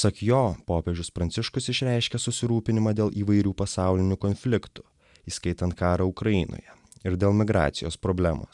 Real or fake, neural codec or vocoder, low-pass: real; none; 10.8 kHz